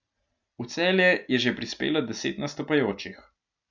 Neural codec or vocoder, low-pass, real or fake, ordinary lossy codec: none; 7.2 kHz; real; none